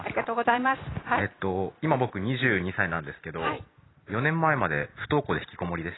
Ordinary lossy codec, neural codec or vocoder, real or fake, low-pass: AAC, 16 kbps; none; real; 7.2 kHz